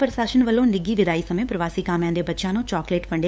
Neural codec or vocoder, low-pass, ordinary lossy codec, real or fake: codec, 16 kHz, 8 kbps, FunCodec, trained on LibriTTS, 25 frames a second; none; none; fake